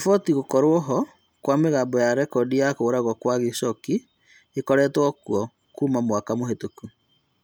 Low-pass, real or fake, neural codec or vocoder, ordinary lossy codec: none; real; none; none